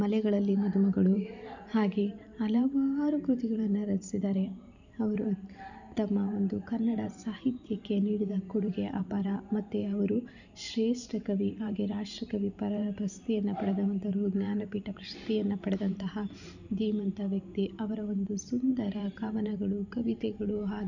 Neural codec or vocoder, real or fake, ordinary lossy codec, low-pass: vocoder, 44.1 kHz, 128 mel bands every 512 samples, BigVGAN v2; fake; none; 7.2 kHz